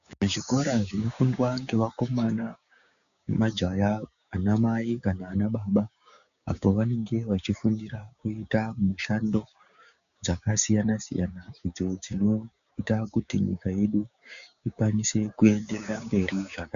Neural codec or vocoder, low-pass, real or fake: codec, 16 kHz, 6 kbps, DAC; 7.2 kHz; fake